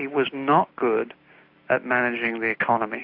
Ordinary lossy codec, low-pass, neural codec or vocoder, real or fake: AAC, 48 kbps; 5.4 kHz; none; real